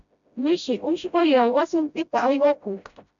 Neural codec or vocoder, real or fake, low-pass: codec, 16 kHz, 0.5 kbps, FreqCodec, smaller model; fake; 7.2 kHz